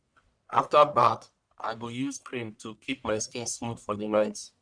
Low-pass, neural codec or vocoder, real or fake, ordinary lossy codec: 9.9 kHz; codec, 44.1 kHz, 1.7 kbps, Pupu-Codec; fake; none